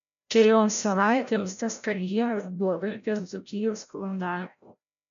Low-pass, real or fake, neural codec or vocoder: 7.2 kHz; fake; codec, 16 kHz, 0.5 kbps, FreqCodec, larger model